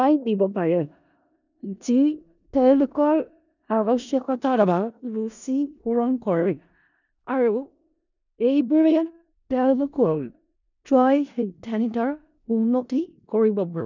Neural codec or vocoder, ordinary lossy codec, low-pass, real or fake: codec, 16 kHz in and 24 kHz out, 0.4 kbps, LongCat-Audio-Codec, four codebook decoder; none; 7.2 kHz; fake